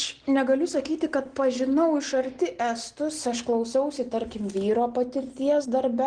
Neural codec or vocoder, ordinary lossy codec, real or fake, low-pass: none; Opus, 16 kbps; real; 9.9 kHz